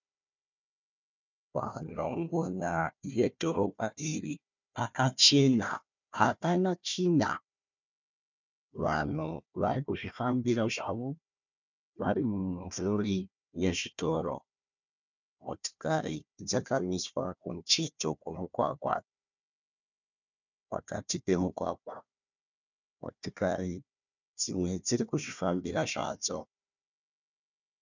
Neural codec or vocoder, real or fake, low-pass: codec, 16 kHz, 1 kbps, FunCodec, trained on Chinese and English, 50 frames a second; fake; 7.2 kHz